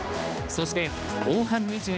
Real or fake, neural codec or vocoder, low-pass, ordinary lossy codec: fake; codec, 16 kHz, 2 kbps, X-Codec, HuBERT features, trained on balanced general audio; none; none